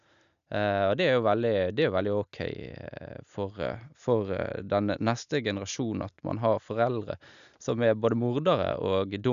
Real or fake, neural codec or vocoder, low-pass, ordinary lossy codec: real; none; 7.2 kHz; none